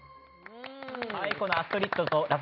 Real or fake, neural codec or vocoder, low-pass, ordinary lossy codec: real; none; 5.4 kHz; none